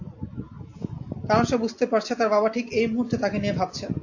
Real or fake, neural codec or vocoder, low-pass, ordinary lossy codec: real; none; 7.2 kHz; AAC, 48 kbps